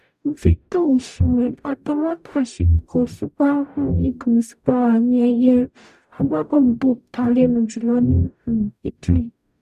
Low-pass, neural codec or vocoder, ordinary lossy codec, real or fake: 14.4 kHz; codec, 44.1 kHz, 0.9 kbps, DAC; none; fake